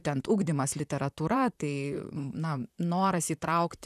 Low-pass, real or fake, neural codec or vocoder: 14.4 kHz; fake; vocoder, 44.1 kHz, 128 mel bands, Pupu-Vocoder